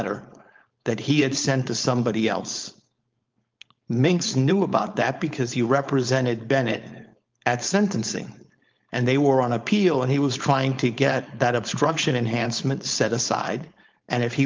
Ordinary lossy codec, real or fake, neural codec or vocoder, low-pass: Opus, 24 kbps; fake; codec, 16 kHz, 4.8 kbps, FACodec; 7.2 kHz